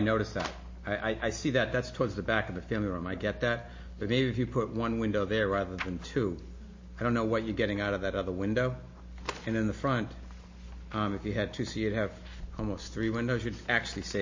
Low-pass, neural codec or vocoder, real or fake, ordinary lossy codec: 7.2 kHz; none; real; MP3, 32 kbps